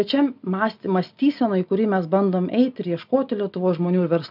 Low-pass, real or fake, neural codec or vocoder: 5.4 kHz; real; none